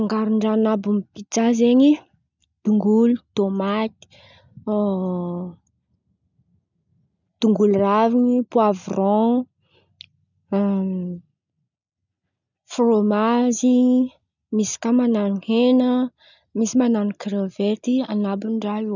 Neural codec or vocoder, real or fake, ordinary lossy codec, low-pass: none; real; none; 7.2 kHz